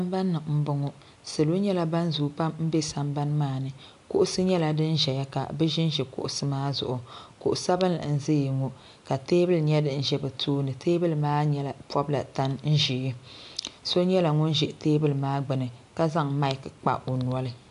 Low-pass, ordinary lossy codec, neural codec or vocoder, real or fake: 10.8 kHz; AAC, 64 kbps; none; real